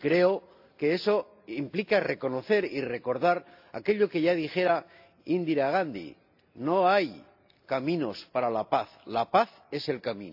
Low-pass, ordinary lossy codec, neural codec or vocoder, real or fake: 5.4 kHz; AAC, 48 kbps; none; real